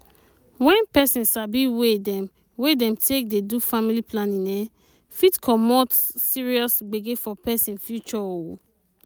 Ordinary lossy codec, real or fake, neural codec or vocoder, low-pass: none; real; none; none